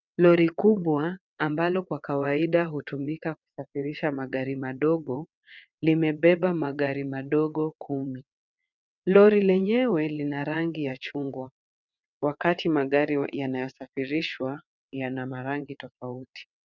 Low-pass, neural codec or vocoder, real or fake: 7.2 kHz; vocoder, 22.05 kHz, 80 mel bands, WaveNeXt; fake